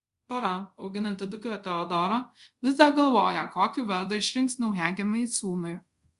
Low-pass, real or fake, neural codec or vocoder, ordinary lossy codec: 10.8 kHz; fake; codec, 24 kHz, 0.5 kbps, DualCodec; Opus, 64 kbps